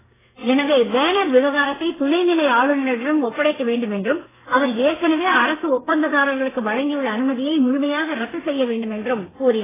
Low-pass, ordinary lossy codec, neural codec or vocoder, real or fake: 3.6 kHz; AAC, 16 kbps; codec, 32 kHz, 1.9 kbps, SNAC; fake